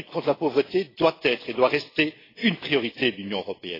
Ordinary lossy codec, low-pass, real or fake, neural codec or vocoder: AAC, 24 kbps; 5.4 kHz; real; none